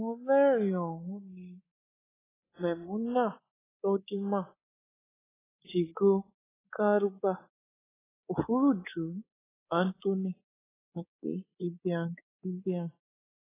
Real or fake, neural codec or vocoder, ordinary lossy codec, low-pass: fake; codec, 24 kHz, 3.1 kbps, DualCodec; AAC, 16 kbps; 3.6 kHz